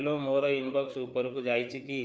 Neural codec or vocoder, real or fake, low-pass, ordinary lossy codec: codec, 16 kHz, 4 kbps, FreqCodec, larger model; fake; none; none